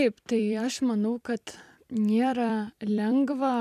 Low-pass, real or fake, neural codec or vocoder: 14.4 kHz; fake; vocoder, 44.1 kHz, 128 mel bands every 256 samples, BigVGAN v2